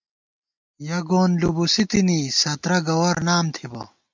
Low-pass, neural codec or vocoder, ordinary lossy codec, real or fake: 7.2 kHz; none; MP3, 64 kbps; real